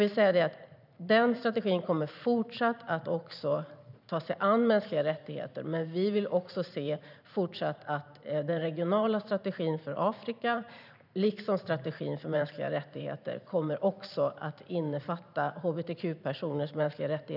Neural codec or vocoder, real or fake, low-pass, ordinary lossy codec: vocoder, 44.1 kHz, 128 mel bands every 512 samples, BigVGAN v2; fake; 5.4 kHz; none